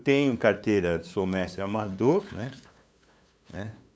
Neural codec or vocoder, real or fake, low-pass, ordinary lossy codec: codec, 16 kHz, 2 kbps, FunCodec, trained on LibriTTS, 25 frames a second; fake; none; none